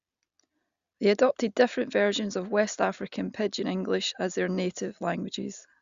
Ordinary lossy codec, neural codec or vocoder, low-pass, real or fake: Opus, 64 kbps; none; 7.2 kHz; real